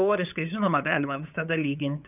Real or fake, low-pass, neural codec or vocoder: fake; 3.6 kHz; codec, 16 kHz, 8 kbps, FunCodec, trained on LibriTTS, 25 frames a second